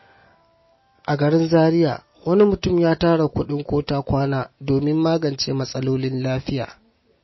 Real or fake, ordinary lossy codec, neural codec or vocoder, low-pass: real; MP3, 24 kbps; none; 7.2 kHz